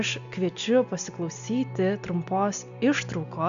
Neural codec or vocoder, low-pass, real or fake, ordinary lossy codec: none; 7.2 kHz; real; AAC, 96 kbps